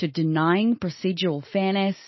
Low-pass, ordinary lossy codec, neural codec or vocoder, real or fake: 7.2 kHz; MP3, 24 kbps; none; real